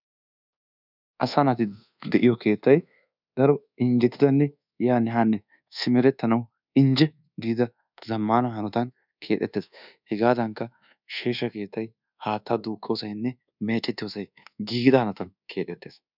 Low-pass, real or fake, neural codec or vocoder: 5.4 kHz; fake; codec, 24 kHz, 1.2 kbps, DualCodec